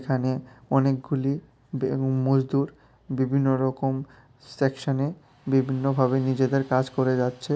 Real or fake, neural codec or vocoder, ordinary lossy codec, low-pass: real; none; none; none